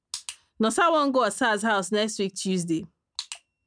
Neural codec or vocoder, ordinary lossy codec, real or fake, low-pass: none; none; real; 9.9 kHz